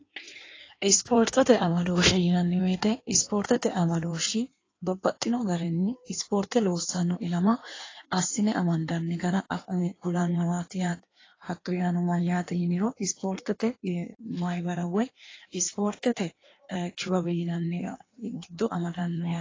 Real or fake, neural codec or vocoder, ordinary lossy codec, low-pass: fake; codec, 16 kHz in and 24 kHz out, 1.1 kbps, FireRedTTS-2 codec; AAC, 32 kbps; 7.2 kHz